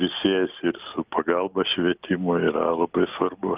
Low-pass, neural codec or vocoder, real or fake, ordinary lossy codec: 3.6 kHz; none; real; Opus, 24 kbps